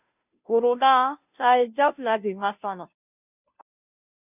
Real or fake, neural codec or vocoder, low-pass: fake; codec, 16 kHz, 0.5 kbps, FunCodec, trained on Chinese and English, 25 frames a second; 3.6 kHz